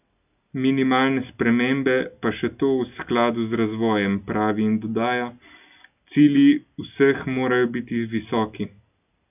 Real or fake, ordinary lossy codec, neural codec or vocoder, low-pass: real; none; none; 3.6 kHz